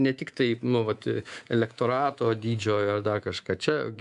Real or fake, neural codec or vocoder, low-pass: fake; codec, 24 kHz, 3.1 kbps, DualCodec; 10.8 kHz